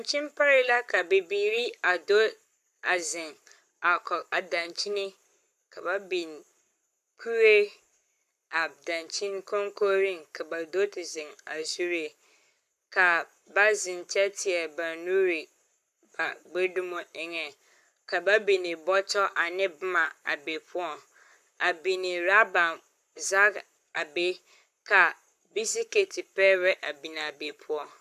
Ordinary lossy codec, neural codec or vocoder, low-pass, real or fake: AAC, 96 kbps; codec, 44.1 kHz, 7.8 kbps, Pupu-Codec; 14.4 kHz; fake